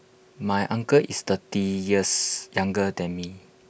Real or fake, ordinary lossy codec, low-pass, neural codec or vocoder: real; none; none; none